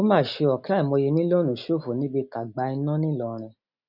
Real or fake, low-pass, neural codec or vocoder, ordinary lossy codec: real; 5.4 kHz; none; none